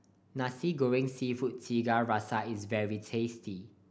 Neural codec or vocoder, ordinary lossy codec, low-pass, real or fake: none; none; none; real